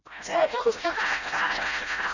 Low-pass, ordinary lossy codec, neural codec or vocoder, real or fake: 7.2 kHz; none; codec, 16 kHz, 0.5 kbps, FreqCodec, smaller model; fake